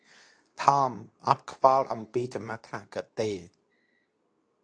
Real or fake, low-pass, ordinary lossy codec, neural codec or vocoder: fake; 9.9 kHz; AAC, 48 kbps; codec, 24 kHz, 0.9 kbps, WavTokenizer, medium speech release version 2